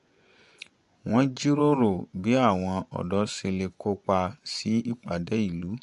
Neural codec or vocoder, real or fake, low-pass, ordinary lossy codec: vocoder, 24 kHz, 100 mel bands, Vocos; fake; 10.8 kHz; MP3, 64 kbps